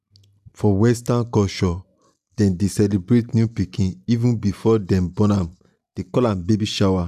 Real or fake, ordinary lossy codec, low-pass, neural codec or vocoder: real; none; 14.4 kHz; none